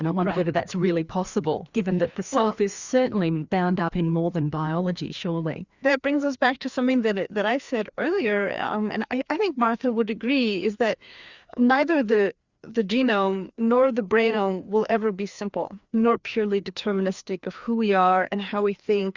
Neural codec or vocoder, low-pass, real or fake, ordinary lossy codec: codec, 16 kHz, 2 kbps, FreqCodec, larger model; 7.2 kHz; fake; Opus, 64 kbps